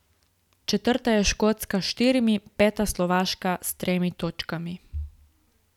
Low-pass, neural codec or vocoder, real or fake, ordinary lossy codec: 19.8 kHz; none; real; none